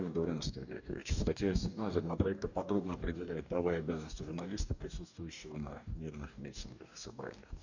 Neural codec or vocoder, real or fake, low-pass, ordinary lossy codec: codec, 44.1 kHz, 2.6 kbps, DAC; fake; 7.2 kHz; none